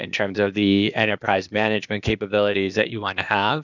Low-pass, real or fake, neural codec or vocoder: 7.2 kHz; fake; codec, 16 kHz, 0.8 kbps, ZipCodec